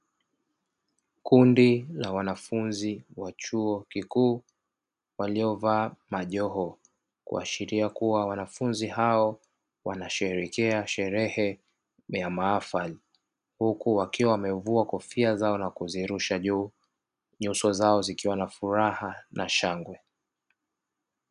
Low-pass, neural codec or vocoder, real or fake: 10.8 kHz; none; real